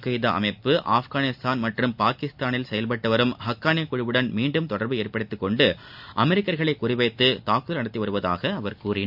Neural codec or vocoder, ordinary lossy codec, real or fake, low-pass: none; none; real; 5.4 kHz